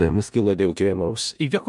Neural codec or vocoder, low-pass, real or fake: codec, 16 kHz in and 24 kHz out, 0.4 kbps, LongCat-Audio-Codec, four codebook decoder; 10.8 kHz; fake